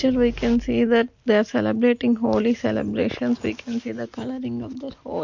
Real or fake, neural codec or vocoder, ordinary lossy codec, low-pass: real; none; MP3, 48 kbps; 7.2 kHz